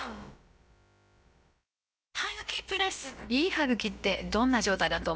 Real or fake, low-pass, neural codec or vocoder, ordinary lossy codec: fake; none; codec, 16 kHz, about 1 kbps, DyCAST, with the encoder's durations; none